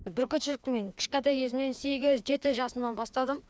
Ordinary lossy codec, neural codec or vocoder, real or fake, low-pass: none; codec, 16 kHz, 2 kbps, FreqCodec, larger model; fake; none